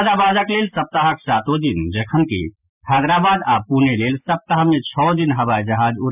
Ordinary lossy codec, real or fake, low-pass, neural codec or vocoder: none; real; 3.6 kHz; none